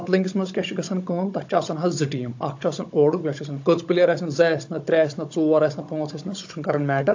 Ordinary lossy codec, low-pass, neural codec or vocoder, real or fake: AAC, 48 kbps; 7.2 kHz; codec, 16 kHz, 16 kbps, FunCodec, trained on Chinese and English, 50 frames a second; fake